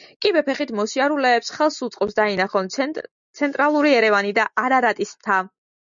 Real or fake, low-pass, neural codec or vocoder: real; 7.2 kHz; none